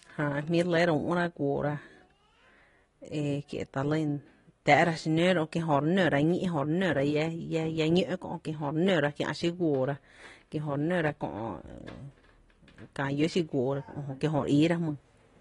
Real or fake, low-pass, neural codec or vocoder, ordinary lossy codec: real; 10.8 kHz; none; AAC, 32 kbps